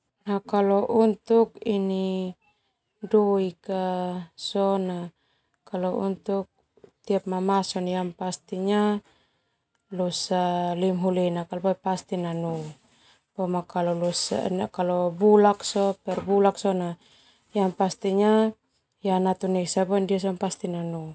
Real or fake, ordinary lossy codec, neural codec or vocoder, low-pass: real; none; none; none